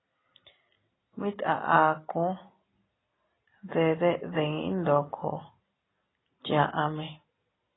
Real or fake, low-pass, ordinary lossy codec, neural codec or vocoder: real; 7.2 kHz; AAC, 16 kbps; none